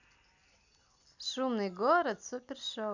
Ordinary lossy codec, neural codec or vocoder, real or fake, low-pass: none; none; real; 7.2 kHz